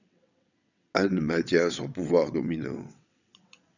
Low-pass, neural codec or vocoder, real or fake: 7.2 kHz; vocoder, 22.05 kHz, 80 mel bands, WaveNeXt; fake